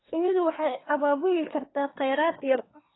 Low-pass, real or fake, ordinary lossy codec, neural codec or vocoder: 7.2 kHz; fake; AAC, 16 kbps; codec, 24 kHz, 1 kbps, SNAC